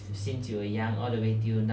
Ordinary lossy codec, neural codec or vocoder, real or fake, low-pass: none; none; real; none